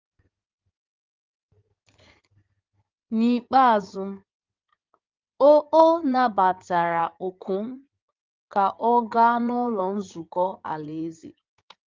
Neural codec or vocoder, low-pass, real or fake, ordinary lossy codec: codec, 16 kHz in and 24 kHz out, 2.2 kbps, FireRedTTS-2 codec; 7.2 kHz; fake; Opus, 32 kbps